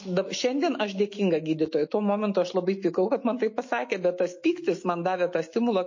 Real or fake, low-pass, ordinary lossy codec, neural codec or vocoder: fake; 7.2 kHz; MP3, 32 kbps; autoencoder, 48 kHz, 128 numbers a frame, DAC-VAE, trained on Japanese speech